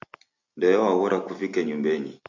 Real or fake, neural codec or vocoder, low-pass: real; none; 7.2 kHz